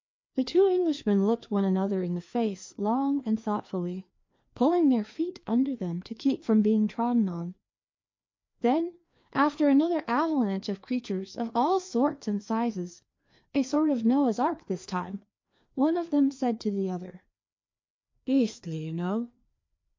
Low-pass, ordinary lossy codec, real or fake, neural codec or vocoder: 7.2 kHz; MP3, 48 kbps; fake; codec, 16 kHz, 2 kbps, FreqCodec, larger model